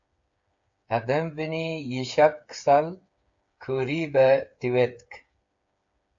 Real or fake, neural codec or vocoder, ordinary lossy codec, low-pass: fake; codec, 16 kHz, 8 kbps, FreqCodec, smaller model; AAC, 64 kbps; 7.2 kHz